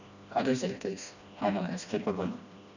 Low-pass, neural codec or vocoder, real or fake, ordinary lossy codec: 7.2 kHz; codec, 16 kHz, 1 kbps, FreqCodec, smaller model; fake; none